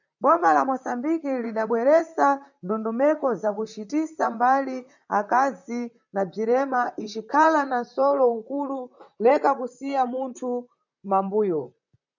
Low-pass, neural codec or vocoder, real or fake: 7.2 kHz; vocoder, 44.1 kHz, 128 mel bands, Pupu-Vocoder; fake